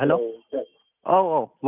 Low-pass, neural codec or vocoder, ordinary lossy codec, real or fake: 3.6 kHz; none; none; real